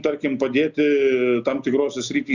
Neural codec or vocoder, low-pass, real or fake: none; 7.2 kHz; real